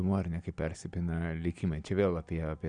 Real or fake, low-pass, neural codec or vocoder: real; 9.9 kHz; none